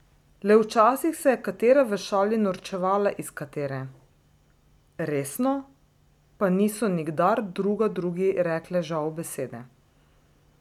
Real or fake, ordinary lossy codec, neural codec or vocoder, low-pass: real; none; none; 19.8 kHz